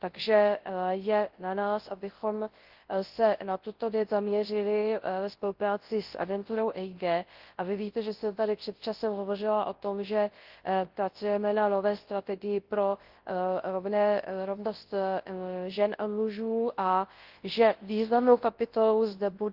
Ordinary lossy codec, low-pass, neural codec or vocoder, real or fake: Opus, 16 kbps; 5.4 kHz; codec, 24 kHz, 0.9 kbps, WavTokenizer, large speech release; fake